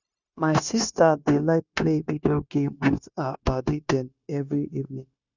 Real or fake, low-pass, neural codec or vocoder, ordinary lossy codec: fake; 7.2 kHz; codec, 16 kHz, 0.9 kbps, LongCat-Audio-Codec; none